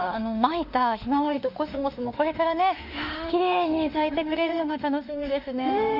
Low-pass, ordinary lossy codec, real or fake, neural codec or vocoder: 5.4 kHz; none; fake; autoencoder, 48 kHz, 32 numbers a frame, DAC-VAE, trained on Japanese speech